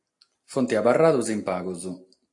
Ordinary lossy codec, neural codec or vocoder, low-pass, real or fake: AAC, 48 kbps; none; 10.8 kHz; real